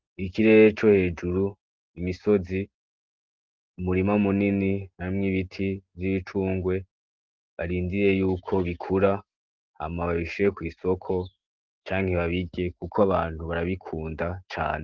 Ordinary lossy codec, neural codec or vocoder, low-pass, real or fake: Opus, 16 kbps; none; 7.2 kHz; real